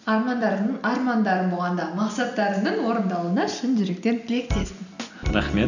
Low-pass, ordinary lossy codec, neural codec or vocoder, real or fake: 7.2 kHz; none; none; real